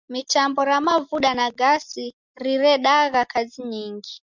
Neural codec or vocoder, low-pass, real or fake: none; 7.2 kHz; real